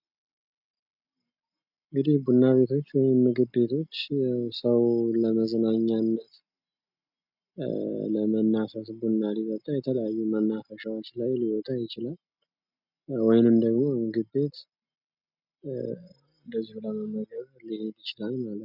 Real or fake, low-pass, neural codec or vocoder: real; 5.4 kHz; none